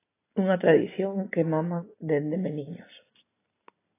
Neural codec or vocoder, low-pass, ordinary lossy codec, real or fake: none; 3.6 kHz; AAC, 16 kbps; real